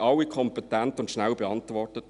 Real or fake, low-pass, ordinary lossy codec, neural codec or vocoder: real; 10.8 kHz; none; none